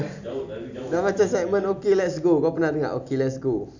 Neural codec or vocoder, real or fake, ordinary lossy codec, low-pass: none; real; none; 7.2 kHz